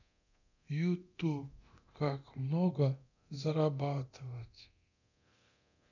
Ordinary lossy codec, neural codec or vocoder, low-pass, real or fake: none; codec, 24 kHz, 0.9 kbps, DualCodec; 7.2 kHz; fake